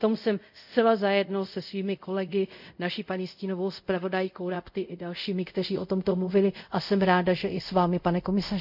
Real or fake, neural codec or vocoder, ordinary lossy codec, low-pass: fake; codec, 24 kHz, 0.5 kbps, DualCodec; none; 5.4 kHz